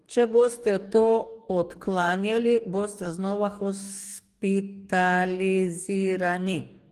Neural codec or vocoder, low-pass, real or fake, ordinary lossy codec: codec, 44.1 kHz, 2.6 kbps, DAC; 14.4 kHz; fake; Opus, 32 kbps